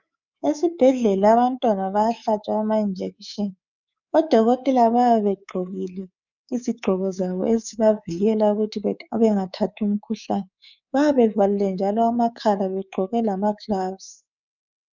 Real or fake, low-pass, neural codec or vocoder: fake; 7.2 kHz; codec, 24 kHz, 3.1 kbps, DualCodec